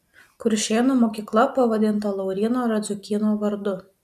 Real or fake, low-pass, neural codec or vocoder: real; 14.4 kHz; none